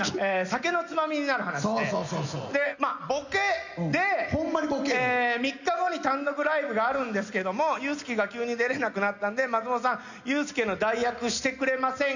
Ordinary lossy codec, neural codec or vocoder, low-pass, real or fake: none; none; 7.2 kHz; real